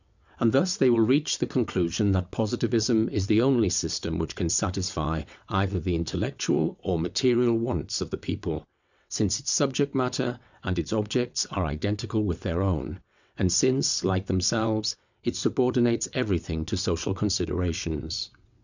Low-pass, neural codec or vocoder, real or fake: 7.2 kHz; vocoder, 22.05 kHz, 80 mel bands, WaveNeXt; fake